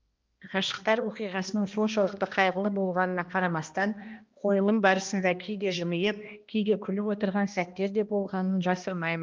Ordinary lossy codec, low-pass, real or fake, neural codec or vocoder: Opus, 32 kbps; 7.2 kHz; fake; codec, 16 kHz, 1 kbps, X-Codec, HuBERT features, trained on balanced general audio